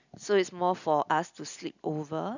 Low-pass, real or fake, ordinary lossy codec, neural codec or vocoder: 7.2 kHz; real; none; none